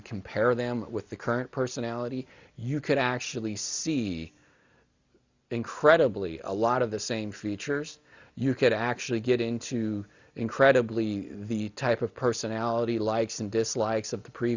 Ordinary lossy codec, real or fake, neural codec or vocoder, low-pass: Opus, 64 kbps; real; none; 7.2 kHz